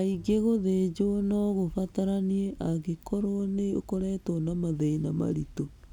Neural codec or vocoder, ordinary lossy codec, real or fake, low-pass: none; none; real; 19.8 kHz